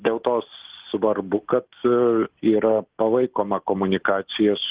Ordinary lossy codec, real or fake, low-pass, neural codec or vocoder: Opus, 16 kbps; real; 3.6 kHz; none